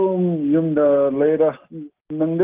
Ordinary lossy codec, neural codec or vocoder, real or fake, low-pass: Opus, 32 kbps; none; real; 3.6 kHz